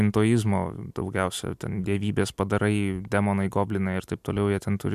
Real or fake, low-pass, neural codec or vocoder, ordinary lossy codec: real; 14.4 kHz; none; MP3, 96 kbps